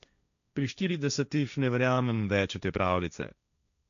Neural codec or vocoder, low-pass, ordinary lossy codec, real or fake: codec, 16 kHz, 1.1 kbps, Voila-Tokenizer; 7.2 kHz; none; fake